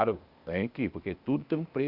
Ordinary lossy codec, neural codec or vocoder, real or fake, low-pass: Opus, 64 kbps; codec, 16 kHz, 0.8 kbps, ZipCodec; fake; 5.4 kHz